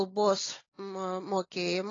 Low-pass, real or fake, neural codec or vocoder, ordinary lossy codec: 7.2 kHz; real; none; AAC, 32 kbps